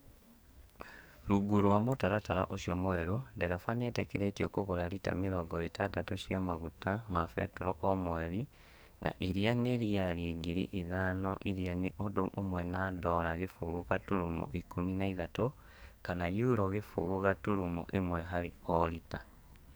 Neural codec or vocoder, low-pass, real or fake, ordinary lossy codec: codec, 44.1 kHz, 2.6 kbps, SNAC; none; fake; none